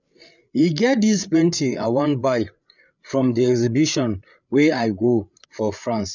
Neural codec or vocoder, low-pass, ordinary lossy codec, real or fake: codec, 16 kHz, 8 kbps, FreqCodec, larger model; 7.2 kHz; none; fake